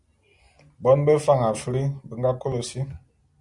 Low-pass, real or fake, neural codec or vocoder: 10.8 kHz; real; none